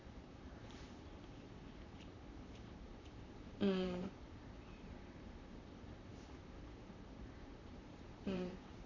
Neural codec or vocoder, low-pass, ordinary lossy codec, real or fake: vocoder, 44.1 kHz, 128 mel bands, Pupu-Vocoder; 7.2 kHz; none; fake